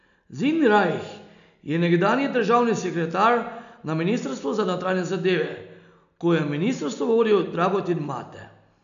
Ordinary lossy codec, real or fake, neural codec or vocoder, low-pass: MP3, 96 kbps; real; none; 7.2 kHz